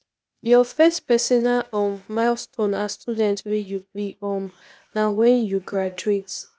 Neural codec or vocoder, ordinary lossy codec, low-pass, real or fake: codec, 16 kHz, 0.8 kbps, ZipCodec; none; none; fake